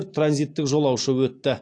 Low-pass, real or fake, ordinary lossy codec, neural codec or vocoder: 9.9 kHz; real; AAC, 48 kbps; none